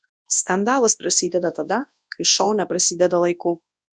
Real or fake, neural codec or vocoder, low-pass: fake; codec, 24 kHz, 0.9 kbps, WavTokenizer, large speech release; 9.9 kHz